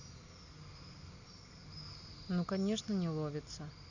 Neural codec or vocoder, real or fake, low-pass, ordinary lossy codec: none; real; 7.2 kHz; none